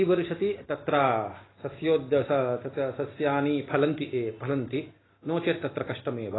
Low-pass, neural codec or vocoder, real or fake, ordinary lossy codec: 7.2 kHz; none; real; AAC, 16 kbps